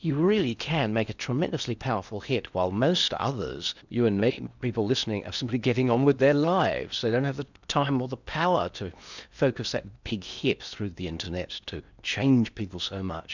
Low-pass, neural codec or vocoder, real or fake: 7.2 kHz; codec, 16 kHz in and 24 kHz out, 0.8 kbps, FocalCodec, streaming, 65536 codes; fake